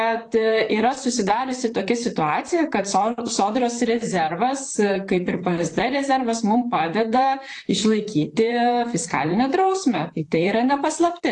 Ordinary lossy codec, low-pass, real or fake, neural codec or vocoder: AAC, 48 kbps; 10.8 kHz; fake; vocoder, 44.1 kHz, 128 mel bands, Pupu-Vocoder